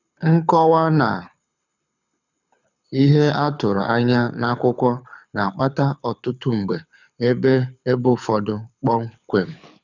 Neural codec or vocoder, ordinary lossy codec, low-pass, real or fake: codec, 24 kHz, 6 kbps, HILCodec; none; 7.2 kHz; fake